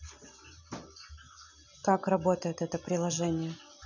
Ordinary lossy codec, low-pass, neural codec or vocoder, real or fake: none; 7.2 kHz; none; real